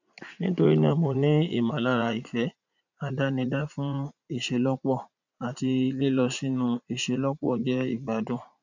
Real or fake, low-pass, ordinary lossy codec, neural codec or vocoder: fake; 7.2 kHz; none; vocoder, 44.1 kHz, 80 mel bands, Vocos